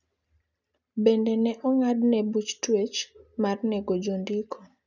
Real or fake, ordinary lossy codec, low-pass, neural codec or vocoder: real; none; 7.2 kHz; none